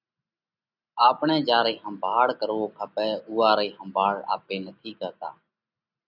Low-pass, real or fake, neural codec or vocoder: 5.4 kHz; real; none